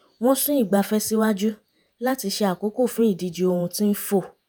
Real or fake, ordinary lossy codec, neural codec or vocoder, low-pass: fake; none; vocoder, 48 kHz, 128 mel bands, Vocos; none